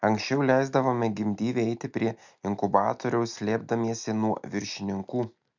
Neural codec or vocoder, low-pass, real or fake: none; 7.2 kHz; real